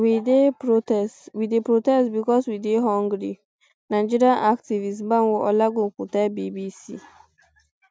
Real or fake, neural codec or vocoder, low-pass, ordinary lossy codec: real; none; none; none